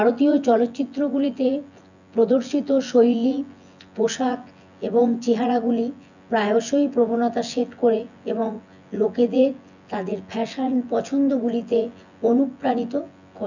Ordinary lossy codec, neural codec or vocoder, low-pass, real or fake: none; vocoder, 24 kHz, 100 mel bands, Vocos; 7.2 kHz; fake